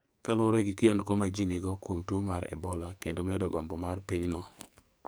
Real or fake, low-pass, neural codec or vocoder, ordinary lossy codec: fake; none; codec, 44.1 kHz, 2.6 kbps, SNAC; none